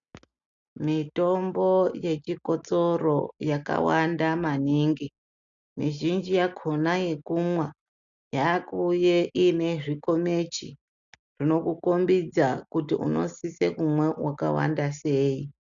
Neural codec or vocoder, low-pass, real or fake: none; 7.2 kHz; real